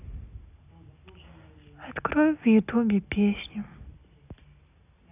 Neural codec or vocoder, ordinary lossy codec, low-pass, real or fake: none; none; 3.6 kHz; real